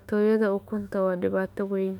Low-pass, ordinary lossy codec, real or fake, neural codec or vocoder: 19.8 kHz; none; fake; autoencoder, 48 kHz, 32 numbers a frame, DAC-VAE, trained on Japanese speech